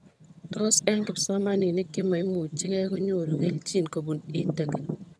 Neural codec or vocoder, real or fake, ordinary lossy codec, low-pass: vocoder, 22.05 kHz, 80 mel bands, HiFi-GAN; fake; none; none